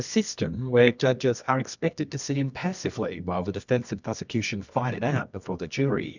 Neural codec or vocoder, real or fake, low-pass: codec, 24 kHz, 0.9 kbps, WavTokenizer, medium music audio release; fake; 7.2 kHz